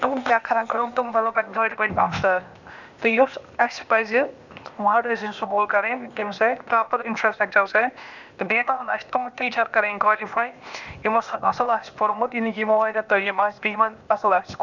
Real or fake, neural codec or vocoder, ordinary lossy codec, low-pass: fake; codec, 16 kHz, 0.8 kbps, ZipCodec; none; 7.2 kHz